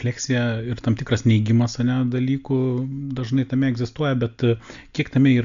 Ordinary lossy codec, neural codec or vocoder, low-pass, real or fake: MP3, 64 kbps; none; 7.2 kHz; real